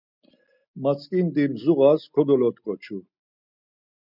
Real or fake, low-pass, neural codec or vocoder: real; 5.4 kHz; none